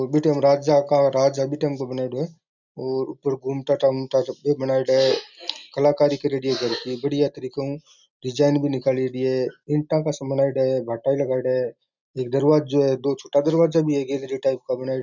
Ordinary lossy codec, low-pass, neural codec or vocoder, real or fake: none; 7.2 kHz; none; real